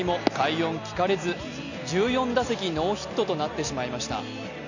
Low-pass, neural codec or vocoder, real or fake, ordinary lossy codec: 7.2 kHz; none; real; none